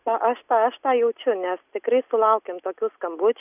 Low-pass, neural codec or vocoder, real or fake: 3.6 kHz; none; real